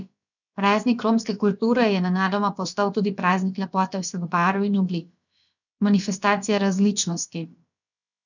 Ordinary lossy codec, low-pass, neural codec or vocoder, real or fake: none; 7.2 kHz; codec, 16 kHz, about 1 kbps, DyCAST, with the encoder's durations; fake